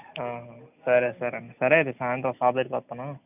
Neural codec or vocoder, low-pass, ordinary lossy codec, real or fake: none; 3.6 kHz; none; real